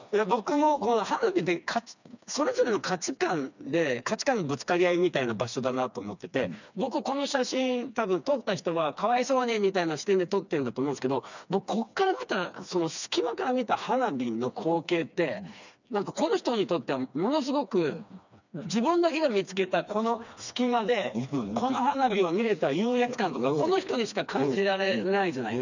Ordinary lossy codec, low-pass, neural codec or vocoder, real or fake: none; 7.2 kHz; codec, 16 kHz, 2 kbps, FreqCodec, smaller model; fake